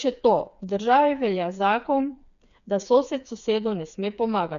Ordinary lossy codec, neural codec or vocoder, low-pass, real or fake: none; codec, 16 kHz, 4 kbps, FreqCodec, smaller model; 7.2 kHz; fake